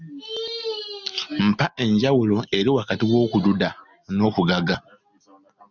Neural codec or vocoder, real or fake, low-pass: none; real; 7.2 kHz